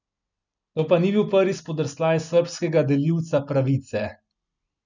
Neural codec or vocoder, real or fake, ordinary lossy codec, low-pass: none; real; none; 7.2 kHz